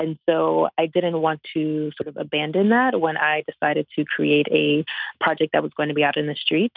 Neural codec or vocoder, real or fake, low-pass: none; real; 5.4 kHz